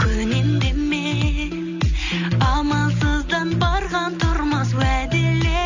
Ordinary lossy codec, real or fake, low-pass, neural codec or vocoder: none; real; 7.2 kHz; none